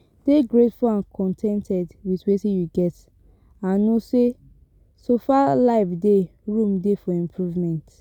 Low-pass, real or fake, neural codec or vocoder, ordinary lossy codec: 19.8 kHz; real; none; none